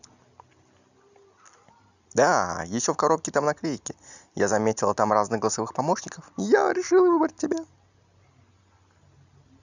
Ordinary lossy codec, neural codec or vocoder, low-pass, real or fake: none; none; 7.2 kHz; real